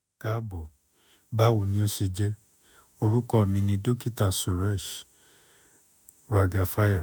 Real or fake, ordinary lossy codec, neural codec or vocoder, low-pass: fake; none; autoencoder, 48 kHz, 32 numbers a frame, DAC-VAE, trained on Japanese speech; none